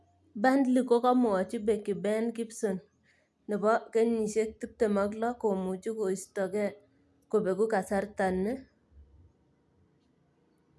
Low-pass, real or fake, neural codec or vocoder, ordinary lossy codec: none; real; none; none